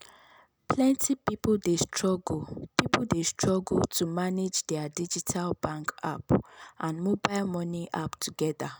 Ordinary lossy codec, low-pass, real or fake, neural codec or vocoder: none; none; real; none